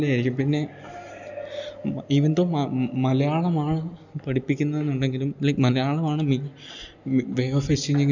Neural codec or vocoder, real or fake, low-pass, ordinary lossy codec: none; real; 7.2 kHz; none